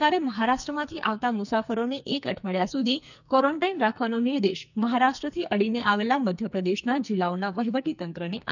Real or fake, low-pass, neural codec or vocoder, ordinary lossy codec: fake; 7.2 kHz; codec, 44.1 kHz, 2.6 kbps, SNAC; none